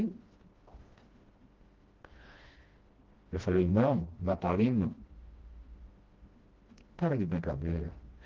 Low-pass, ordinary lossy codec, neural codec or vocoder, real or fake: 7.2 kHz; Opus, 16 kbps; codec, 16 kHz, 1 kbps, FreqCodec, smaller model; fake